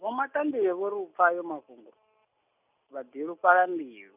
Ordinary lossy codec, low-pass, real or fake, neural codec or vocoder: none; 3.6 kHz; real; none